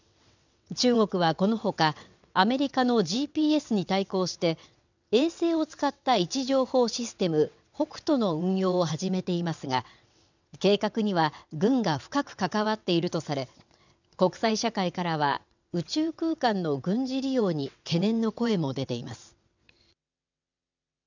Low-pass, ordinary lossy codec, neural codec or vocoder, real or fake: 7.2 kHz; none; vocoder, 22.05 kHz, 80 mel bands, WaveNeXt; fake